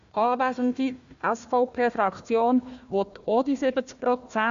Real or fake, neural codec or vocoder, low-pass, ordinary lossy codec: fake; codec, 16 kHz, 1 kbps, FunCodec, trained on Chinese and English, 50 frames a second; 7.2 kHz; MP3, 64 kbps